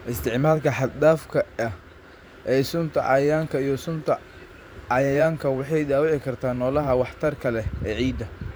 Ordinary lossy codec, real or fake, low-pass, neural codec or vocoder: none; fake; none; vocoder, 44.1 kHz, 128 mel bands every 512 samples, BigVGAN v2